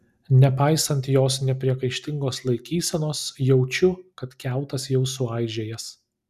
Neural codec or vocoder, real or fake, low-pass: none; real; 14.4 kHz